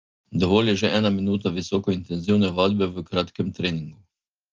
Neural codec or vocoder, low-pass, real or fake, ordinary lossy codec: none; 7.2 kHz; real; Opus, 32 kbps